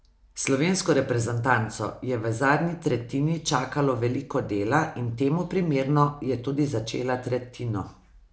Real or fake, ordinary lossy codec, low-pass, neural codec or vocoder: real; none; none; none